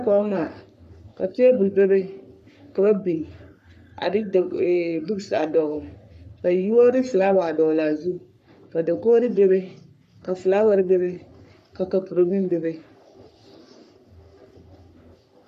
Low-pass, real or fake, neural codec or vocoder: 14.4 kHz; fake; codec, 44.1 kHz, 3.4 kbps, Pupu-Codec